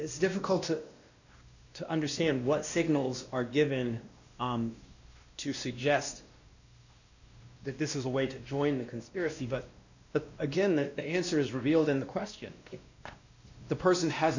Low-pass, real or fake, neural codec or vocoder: 7.2 kHz; fake; codec, 16 kHz, 1 kbps, X-Codec, WavLM features, trained on Multilingual LibriSpeech